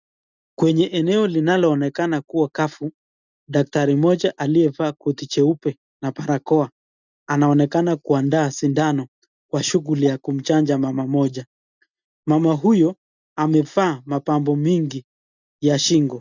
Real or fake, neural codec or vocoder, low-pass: real; none; 7.2 kHz